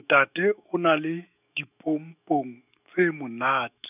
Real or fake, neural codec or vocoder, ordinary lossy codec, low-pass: fake; autoencoder, 48 kHz, 128 numbers a frame, DAC-VAE, trained on Japanese speech; none; 3.6 kHz